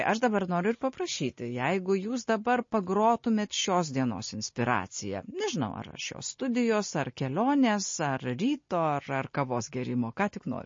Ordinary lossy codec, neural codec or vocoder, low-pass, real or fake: MP3, 32 kbps; none; 7.2 kHz; real